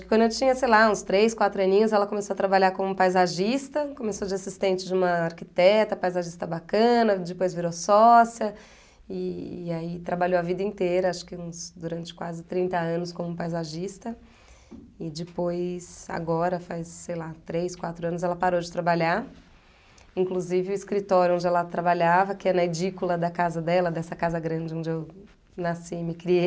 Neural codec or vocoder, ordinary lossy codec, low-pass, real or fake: none; none; none; real